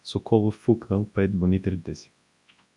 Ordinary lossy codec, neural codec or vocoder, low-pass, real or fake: AAC, 64 kbps; codec, 24 kHz, 0.9 kbps, WavTokenizer, large speech release; 10.8 kHz; fake